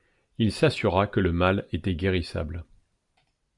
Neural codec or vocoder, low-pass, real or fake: none; 10.8 kHz; real